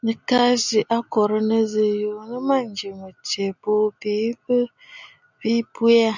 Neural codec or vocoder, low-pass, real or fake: none; 7.2 kHz; real